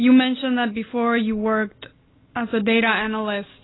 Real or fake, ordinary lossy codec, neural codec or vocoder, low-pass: real; AAC, 16 kbps; none; 7.2 kHz